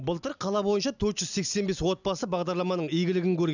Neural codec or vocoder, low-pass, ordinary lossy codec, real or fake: none; 7.2 kHz; none; real